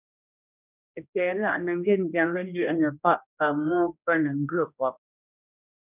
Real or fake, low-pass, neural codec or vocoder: fake; 3.6 kHz; codec, 16 kHz, 1 kbps, X-Codec, HuBERT features, trained on general audio